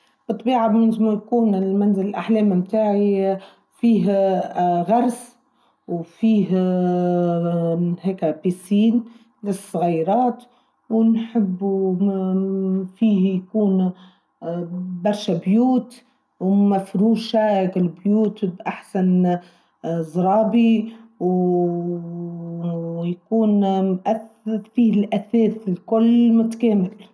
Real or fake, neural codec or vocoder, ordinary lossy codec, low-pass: real; none; none; none